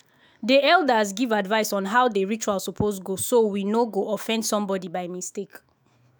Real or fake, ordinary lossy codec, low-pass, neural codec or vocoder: fake; none; none; autoencoder, 48 kHz, 128 numbers a frame, DAC-VAE, trained on Japanese speech